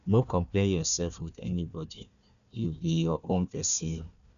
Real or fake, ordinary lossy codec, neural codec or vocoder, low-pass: fake; none; codec, 16 kHz, 1 kbps, FunCodec, trained on Chinese and English, 50 frames a second; 7.2 kHz